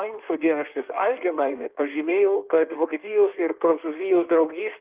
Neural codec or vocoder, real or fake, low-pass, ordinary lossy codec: codec, 16 kHz in and 24 kHz out, 1.1 kbps, FireRedTTS-2 codec; fake; 3.6 kHz; Opus, 32 kbps